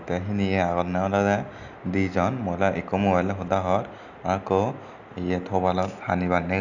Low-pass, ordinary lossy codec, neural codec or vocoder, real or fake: 7.2 kHz; none; none; real